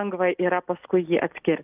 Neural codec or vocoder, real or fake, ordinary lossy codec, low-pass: none; real; Opus, 24 kbps; 3.6 kHz